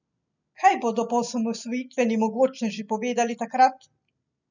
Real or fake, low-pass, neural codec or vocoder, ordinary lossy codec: real; 7.2 kHz; none; none